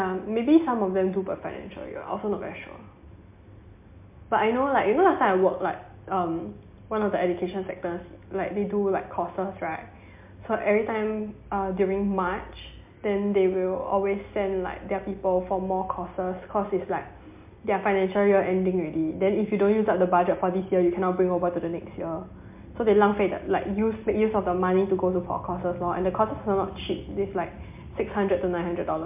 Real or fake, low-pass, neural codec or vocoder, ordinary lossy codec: real; 3.6 kHz; none; MP3, 32 kbps